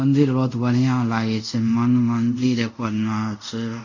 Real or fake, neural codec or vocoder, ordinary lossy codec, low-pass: fake; codec, 24 kHz, 0.5 kbps, DualCodec; none; 7.2 kHz